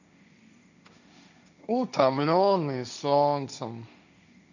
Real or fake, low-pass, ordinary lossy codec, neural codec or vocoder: fake; 7.2 kHz; none; codec, 16 kHz, 1.1 kbps, Voila-Tokenizer